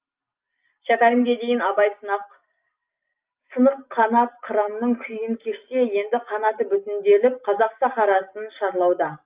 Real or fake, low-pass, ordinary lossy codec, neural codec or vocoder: real; 3.6 kHz; Opus, 32 kbps; none